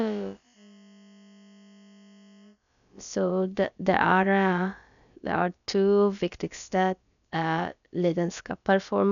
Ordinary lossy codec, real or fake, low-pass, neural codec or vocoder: none; fake; 7.2 kHz; codec, 16 kHz, about 1 kbps, DyCAST, with the encoder's durations